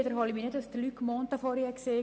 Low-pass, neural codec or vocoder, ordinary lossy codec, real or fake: none; none; none; real